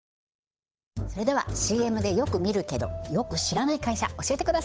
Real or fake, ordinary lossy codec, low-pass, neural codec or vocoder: fake; none; none; codec, 16 kHz, 8 kbps, FunCodec, trained on Chinese and English, 25 frames a second